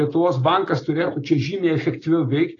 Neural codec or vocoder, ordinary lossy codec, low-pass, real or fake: none; AAC, 32 kbps; 7.2 kHz; real